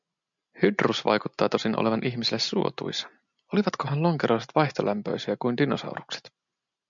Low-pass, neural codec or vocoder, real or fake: 7.2 kHz; none; real